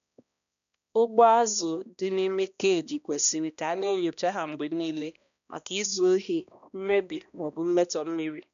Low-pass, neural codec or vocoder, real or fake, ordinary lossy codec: 7.2 kHz; codec, 16 kHz, 1 kbps, X-Codec, HuBERT features, trained on balanced general audio; fake; none